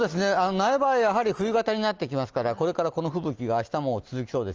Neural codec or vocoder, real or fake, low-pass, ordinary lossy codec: none; real; 7.2 kHz; Opus, 24 kbps